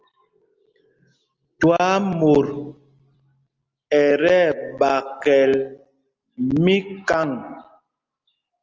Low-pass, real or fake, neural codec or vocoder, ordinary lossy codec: 7.2 kHz; real; none; Opus, 24 kbps